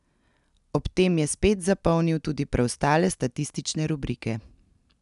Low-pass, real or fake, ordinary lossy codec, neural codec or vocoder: 10.8 kHz; real; none; none